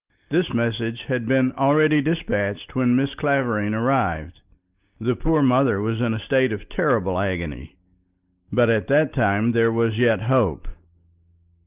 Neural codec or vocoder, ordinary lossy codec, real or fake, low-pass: none; Opus, 24 kbps; real; 3.6 kHz